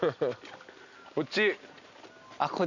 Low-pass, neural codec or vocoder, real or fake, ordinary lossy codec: 7.2 kHz; none; real; none